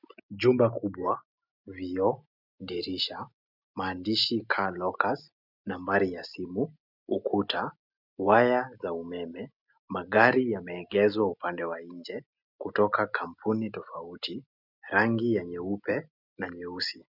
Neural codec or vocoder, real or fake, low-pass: none; real; 5.4 kHz